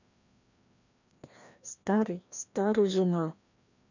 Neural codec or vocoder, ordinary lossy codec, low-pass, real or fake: codec, 16 kHz, 1 kbps, FreqCodec, larger model; none; 7.2 kHz; fake